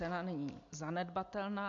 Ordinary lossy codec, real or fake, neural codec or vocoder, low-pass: MP3, 64 kbps; real; none; 7.2 kHz